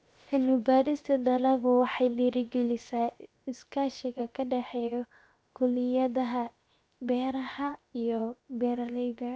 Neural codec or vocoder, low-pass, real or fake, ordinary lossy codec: codec, 16 kHz, 0.7 kbps, FocalCodec; none; fake; none